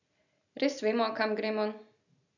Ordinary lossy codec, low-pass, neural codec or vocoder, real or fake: none; 7.2 kHz; none; real